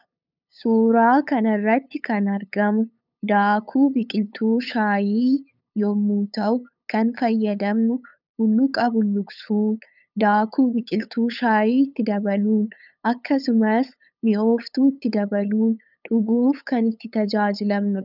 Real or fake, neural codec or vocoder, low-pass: fake; codec, 16 kHz, 8 kbps, FunCodec, trained on LibriTTS, 25 frames a second; 5.4 kHz